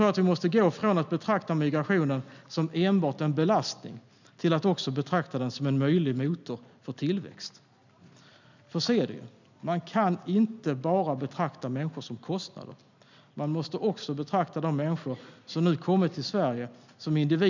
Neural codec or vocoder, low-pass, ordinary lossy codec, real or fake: none; 7.2 kHz; none; real